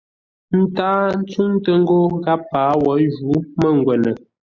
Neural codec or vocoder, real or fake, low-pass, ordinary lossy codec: none; real; 7.2 kHz; Opus, 64 kbps